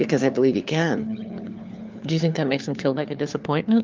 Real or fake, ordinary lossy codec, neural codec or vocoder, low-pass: fake; Opus, 24 kbps; codec, 16 kHz, 4 kbps, FunCodec, trained on LibriTTS, 50 frames a second; 7.2 kHz